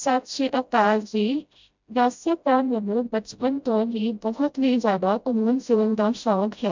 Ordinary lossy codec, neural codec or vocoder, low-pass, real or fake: MP3, 64 kbps; codec, 16 kHz, 0.5 kbps, FreqCodec, smaller model; 7.2 kHz; fake